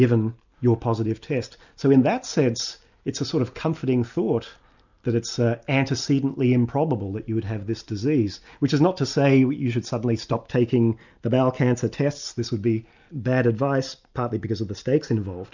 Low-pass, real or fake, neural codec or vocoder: 7.2 kHz; real; none